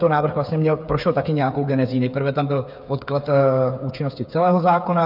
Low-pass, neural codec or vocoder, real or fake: 5.4 kHz; codec, 16 kHz, 8 kbps, FreqCodec, smaller model; fake